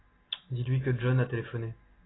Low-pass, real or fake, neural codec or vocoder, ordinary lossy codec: 7.2 kHz; real; none; AAC, 16 kbps